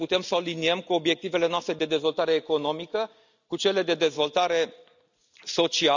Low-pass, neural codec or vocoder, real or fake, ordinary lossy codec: 7.2 kHz; none; real; none